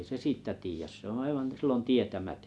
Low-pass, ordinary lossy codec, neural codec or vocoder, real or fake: none; none; none; real